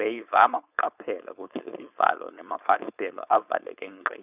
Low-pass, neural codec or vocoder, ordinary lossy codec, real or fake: 3.6 kHz; codec, 16 kHz, 4.8 kbps, FACodec; none; fake